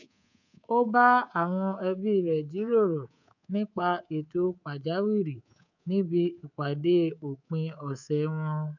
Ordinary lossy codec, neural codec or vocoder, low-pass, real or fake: none; codec, 24 kHz, 3.1 kbps, DualCodec; 7.2 kHz; fake